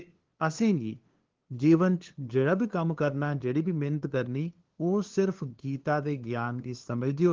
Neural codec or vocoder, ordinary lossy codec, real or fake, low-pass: codec, 16 kHz, 2 kbps, FunCodec, trained on LibriTTS, 25 frames a second; Opus, 16 kbps; fake; 7.2 kHz